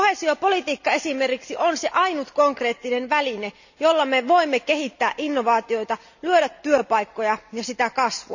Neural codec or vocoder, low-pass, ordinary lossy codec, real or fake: none; 7.2 kHz; none; real